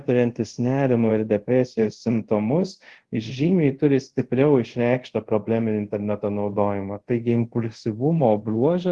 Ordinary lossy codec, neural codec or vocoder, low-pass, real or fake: Opus, 16 kbps; codec, 24 kHz, 0.5 kbps, DualCodec; 10.8 kHz; fake